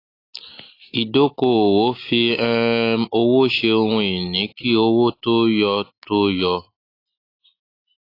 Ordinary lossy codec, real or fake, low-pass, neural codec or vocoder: AAC, 32 kbps; real; 5.4 kHz; none